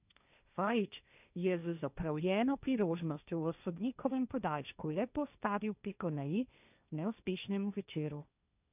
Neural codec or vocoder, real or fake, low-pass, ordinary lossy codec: codec, 16 kHz, 1.1 kbps, Voila-Tokenizer; fake; 3.6 kHz; none